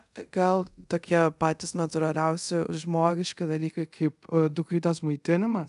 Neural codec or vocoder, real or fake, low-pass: codec, 24 kHz, 0.5 kbps, DualCodec; fake; 10.8 kHz